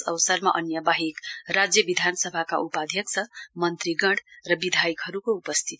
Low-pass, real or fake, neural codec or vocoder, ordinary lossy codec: none; real; none; none